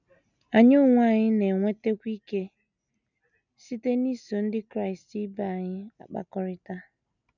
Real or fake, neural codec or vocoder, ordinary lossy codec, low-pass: real; none; none; 7.2 kHz